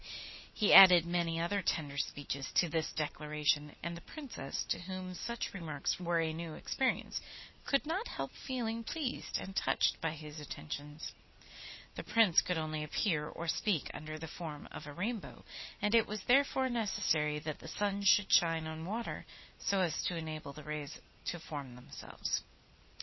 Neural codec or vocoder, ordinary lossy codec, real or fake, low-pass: none; MP3, 24 kbps; real; 7.2 kHz